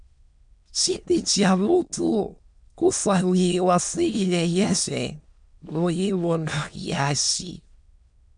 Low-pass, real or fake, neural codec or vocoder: 9.9 kHz; fake; autoencoder, 22.05 kHz, a latent of 192 numbers a frame, VITS, trained on many speakers